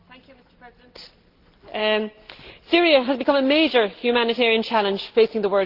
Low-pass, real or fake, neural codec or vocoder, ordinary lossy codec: 5.4 kHz; real; none; Opus, 16 kbps